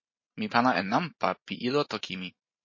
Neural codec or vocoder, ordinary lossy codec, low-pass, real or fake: vocoder, 44.1 kHz, 128 mel bands every 512 samples, BigVGAN v2; MP3, 32 kbps; 7.2 kHz; fake